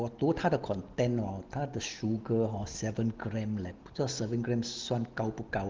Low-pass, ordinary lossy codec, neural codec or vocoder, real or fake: 7.2 kHz; Opus, 16 kbps; none; real